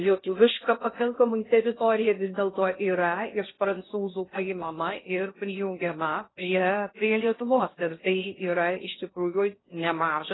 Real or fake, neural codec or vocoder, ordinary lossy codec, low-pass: fake; codec, 16 kHz in and 24 kHz out, 0.6 kbps, FocalCodec, streaming, 2048 codes; AAC, 16 kbps; 7.2 kHz